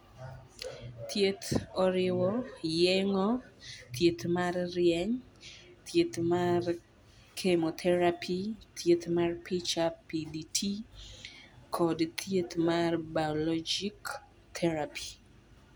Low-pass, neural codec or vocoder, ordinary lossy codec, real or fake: none; none; none; real